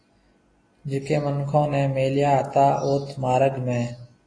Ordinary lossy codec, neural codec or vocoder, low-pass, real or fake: AAC, 32 kbps; none; 9.9 kHz; real